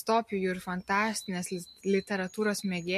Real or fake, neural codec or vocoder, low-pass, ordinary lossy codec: real; none; 14.4 kHz; MP3, 64 kbps